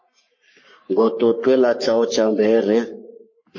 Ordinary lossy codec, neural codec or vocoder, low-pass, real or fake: MP3, 32 kbps; codec, 44.1 kHz, 3.4 kbps, Pupu-Codec; 7.2 kHz; fake